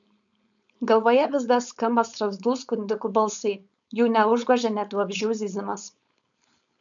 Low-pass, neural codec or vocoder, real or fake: 7.2 kHz; codec, 16 kHz, 4.8 kbps, FACodec; fake